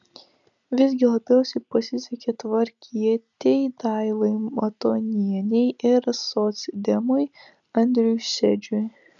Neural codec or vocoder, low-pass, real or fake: none; 7.2 kHz; real